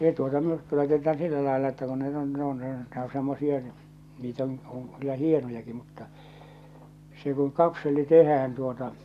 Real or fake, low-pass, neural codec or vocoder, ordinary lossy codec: real; 14.4 kHz; none; none